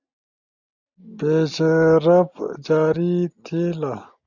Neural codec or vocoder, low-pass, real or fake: none; 7.2 kHz; real